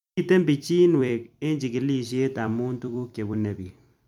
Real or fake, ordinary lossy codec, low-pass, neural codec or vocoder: fake; MP3, 96 kbps; 19.8 kHz; vocoder, 44.1 kHz, 128 mel bands every 256 samples, BigVGAN v2